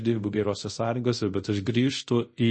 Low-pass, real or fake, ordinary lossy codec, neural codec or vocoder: 9.9 kHz; fake; MP3, 32 kbps; codec, 24 kHz, 0.5 kbps, DualCodec